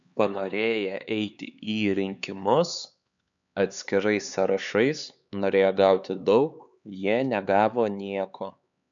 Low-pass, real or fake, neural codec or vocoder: 7.2 kHz; fake; codec, 16 kHz, 4 kbps, X-Codec, HuBERT features, trained on LibriSpeech